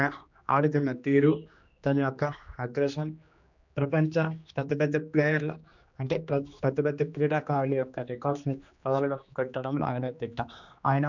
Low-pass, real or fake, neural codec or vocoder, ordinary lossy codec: 7.2 kHz; fake; codec, 16 kHz, 2 kbps, X-Codec, HuBERT features, trained on general audio; none